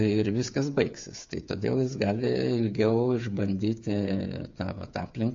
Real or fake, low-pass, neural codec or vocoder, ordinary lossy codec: fake; 7.2 kHz; codec, 16 kHz, 8 kbps, FreqCodec, smaller model; MP3, 48 kbps